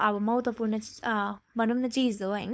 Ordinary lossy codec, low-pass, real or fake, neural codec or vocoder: none; none; fake; codec, 16 kHz, 4.8 kbps, FACodec